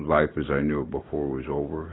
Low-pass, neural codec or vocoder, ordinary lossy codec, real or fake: 7.2 kHz; none; AAC, 16 kbps; real